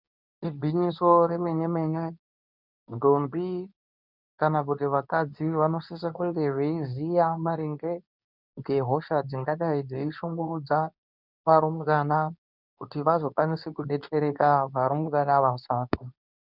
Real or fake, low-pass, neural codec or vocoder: fake; 5.4 kHz; codec, 24 kHz, 0.9 kbps, WavTokenizer, medium speech release version 2